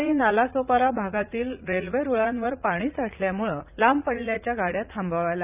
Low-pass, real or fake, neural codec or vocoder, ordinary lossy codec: 3.6 kHz; fake; vocoder, 44.1 kHz, 80 mel bands, Vocos; none